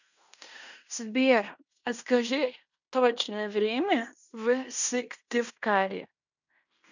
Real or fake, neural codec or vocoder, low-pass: fake; codec, 16 kHz in and 24 kHz out, 0.9 kbps, LongCat-Audio-Codec, fine tuned four codebook decoder; 7.2 kHz